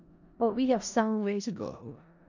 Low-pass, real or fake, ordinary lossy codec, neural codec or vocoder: 7.2 kHz; fake; none; codec, 16 kHz in and 24 kHz out, 0.4 kbps, LongCat-Audio-Codec, four codebook decoder